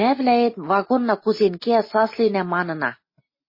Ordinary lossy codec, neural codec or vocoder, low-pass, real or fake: MP3, 24 kbps; none; 5.4 kHz; real